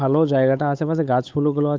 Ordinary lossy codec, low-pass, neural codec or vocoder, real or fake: none; none; codec, 16 kHz, 8 kbps, FunCodec, trained on Chinese and English, 25 frames a second; fake